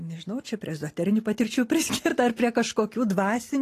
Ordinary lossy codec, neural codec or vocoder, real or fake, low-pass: AAC, 48 kbps; none; real; 14.4 kHz